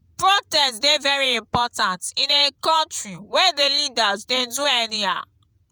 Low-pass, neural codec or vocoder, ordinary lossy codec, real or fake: none; vocoder, 48 kHz, 128 mel bands, Vocos; none; fake